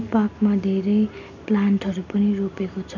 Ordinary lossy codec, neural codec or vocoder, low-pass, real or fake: none; none; 7.2 kHz; real